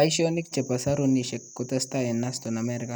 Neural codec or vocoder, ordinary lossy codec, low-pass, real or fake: none; none; none; real